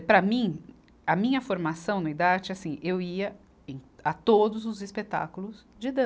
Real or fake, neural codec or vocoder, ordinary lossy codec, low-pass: real; none; none; none